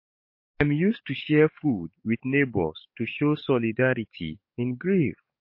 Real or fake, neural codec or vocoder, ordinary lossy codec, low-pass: fake; codec, 44.1 kHz, 7.8 kbps, DAC; MP3, 32 kbps; 5.4 kHz